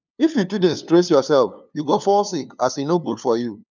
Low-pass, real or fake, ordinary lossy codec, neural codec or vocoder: 7.2 kHz; fake; none; codec, 16 kHz, 2 kbps, FunCodec, trained on LibriTTS, 25 frames a second